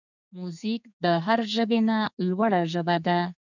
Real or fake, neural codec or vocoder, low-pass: fake; codec, 32 kHz, 1.9 kbps, SNAC; 7.2 kHz